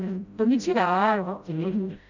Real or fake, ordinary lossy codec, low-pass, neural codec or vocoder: fake; none; 7.2 kHz; codec, 16 kHz, 0.5 kbps, FreqCodec, smaller model